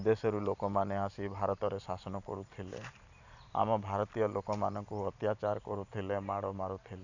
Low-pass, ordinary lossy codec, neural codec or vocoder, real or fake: 7.2 kHz; none; vocoder, 44.1 kHz, 128 mel bands every 512 samples, BigVGAN v2; fake